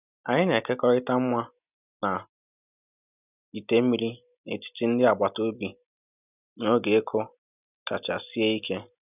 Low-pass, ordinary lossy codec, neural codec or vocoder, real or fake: 3.6 kHz; none; none; real